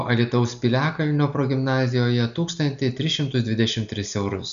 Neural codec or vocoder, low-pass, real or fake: none; 7.2 kHz; real